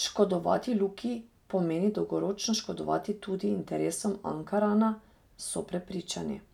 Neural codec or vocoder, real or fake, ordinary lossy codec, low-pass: none; real; none; 19.8 kHz